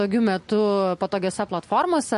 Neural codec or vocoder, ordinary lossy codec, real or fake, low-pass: none; MP3, 48 kbps; real; 14.4 kHz